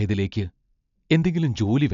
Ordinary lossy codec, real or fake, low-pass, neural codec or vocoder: none; real; 7.2 kHz; none